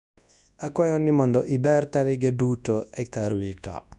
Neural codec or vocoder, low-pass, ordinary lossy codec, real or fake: codec, 24 kHz, 0.9 kbps, WavTokenizer, large speech release; 10.8 kHz; none; fake